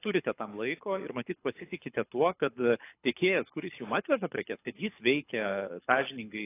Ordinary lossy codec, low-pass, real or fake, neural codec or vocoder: AAC, 24 kbps; 3.6 kHz; fake; codec, 24 kHz, 6 kbps, HILCodec